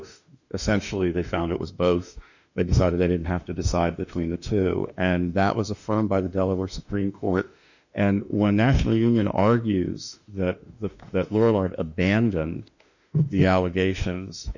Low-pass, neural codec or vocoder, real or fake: 7.2 kHz; autoencoder, 48 kHz, 32 numbers a frame, DAC-VAE, trained on Japanese speech; fake